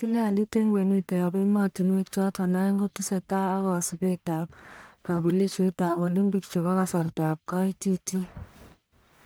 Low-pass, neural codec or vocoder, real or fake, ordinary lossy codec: none; codec, 44.1 kHz, 1.7 kbps, Pupu-Codec; fake; none